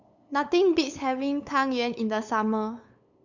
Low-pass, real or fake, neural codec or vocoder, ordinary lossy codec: 7.2 kHz; fake; codec, 16 kHz, 8 kbps, FunCodec, trained on LibriTTS, 25 frames a second; none